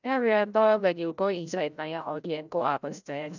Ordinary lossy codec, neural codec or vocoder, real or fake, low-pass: none; codec, 16 kHz, 0.5 kbps, FreqCodec, larger model; fake; 7.2 kHz